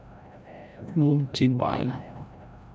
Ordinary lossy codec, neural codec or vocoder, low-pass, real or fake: none; codec, 16 kHz, 0.5 kbps, FreqCodec, larger model; none; fake